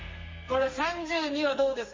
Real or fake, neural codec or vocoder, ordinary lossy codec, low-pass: fake; codec, 32 kHz, 1.9 kbps, SNAC; MP3, 32 kbps; 7.2 kHz